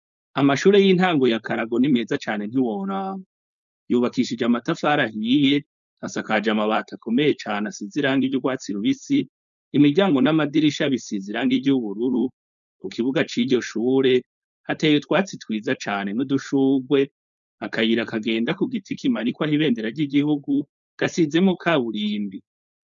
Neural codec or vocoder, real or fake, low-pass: codec, 16 kHz, 4.8 kbps, FACodec; fake; 7.2 kHz